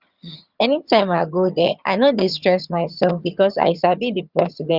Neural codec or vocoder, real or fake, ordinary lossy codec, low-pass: vocoder, 22.05 kHz, 80 mel bands, HiFi-GAN; fake; Opus, 64 kbps; 5.4 kHz